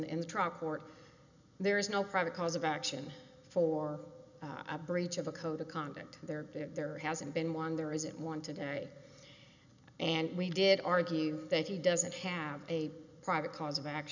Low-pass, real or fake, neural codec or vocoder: 7.2 kHz; real; none